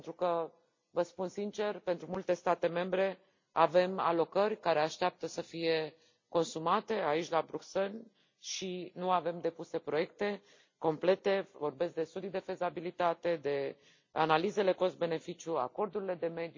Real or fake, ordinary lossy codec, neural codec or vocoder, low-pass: real; MP3, 48 kbps; none; 7.2 kHz